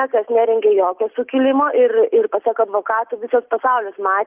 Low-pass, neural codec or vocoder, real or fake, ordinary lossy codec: 3.6 kHz; none; real; Opus, 32 kbps